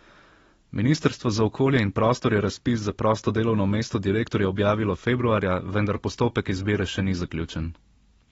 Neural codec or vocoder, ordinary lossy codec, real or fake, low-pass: none; AAC, 24 kbps; real; 19.8 kHz